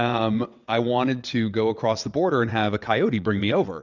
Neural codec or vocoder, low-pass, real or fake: vocoder, 22.05 kHz, 80 mel bands, WaveNeXt; 7.2 kHz; fake